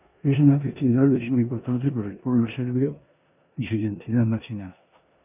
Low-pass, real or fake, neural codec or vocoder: 3.6 kHz; fake; codec, 16 kHz in and 24 kHz out, 0.9 kbps, LongCat-Audio-Codec, four codebook decoder